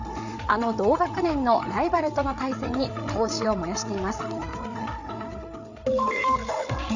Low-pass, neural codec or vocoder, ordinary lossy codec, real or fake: 7.2 kHz; codec, 16 kHz, 8 kbps, FreqCodec, larger model; none; fake